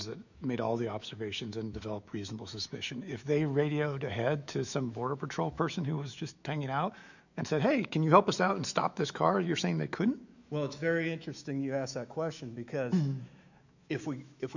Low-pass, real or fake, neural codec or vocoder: 7.2 kHz; fake; autoencoder, 48 kHz, 128 numbers a frame, DAC-VAE, trained on Japanese speech